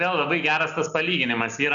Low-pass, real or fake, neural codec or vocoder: 7.2 kHz; real; none